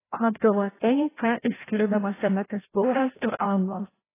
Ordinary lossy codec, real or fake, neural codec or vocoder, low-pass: AAC, 16 kbps; fake; codec, 16 kHz, 0.5 kbps, FreqCodec, larger model; 3.6 kHz